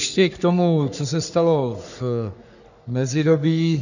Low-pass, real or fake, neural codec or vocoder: 7.2 kHz; fake; codec, 44.1 kHz, 3.4 kbps, Pupu-Codec